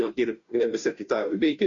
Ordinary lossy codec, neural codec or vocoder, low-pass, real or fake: MP3, 96 kbps; codec, 16 kHz, 0.5 kbps, FunCodec, trained on Chinese and English, 25 frames a second; 7.2 kHz; fake